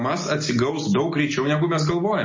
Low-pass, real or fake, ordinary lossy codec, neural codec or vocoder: 7.2 kHz; real; MP3, 32 kbps; none